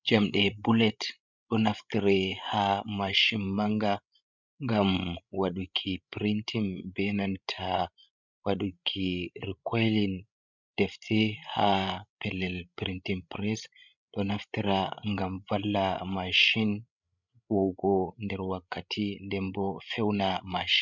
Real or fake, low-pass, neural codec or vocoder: fake; 7.2 kHz; codec, 16 kHz, 16 kbps, FreqCodec, larger model